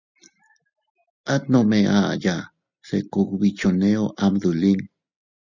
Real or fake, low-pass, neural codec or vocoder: real; 7.2 kHz; none